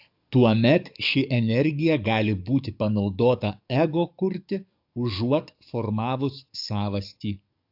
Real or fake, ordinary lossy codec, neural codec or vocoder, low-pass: fake; AAC, 48 kbps; codec, 44.1 kHz, 7.8 kbps, DAC; 5.4 kHz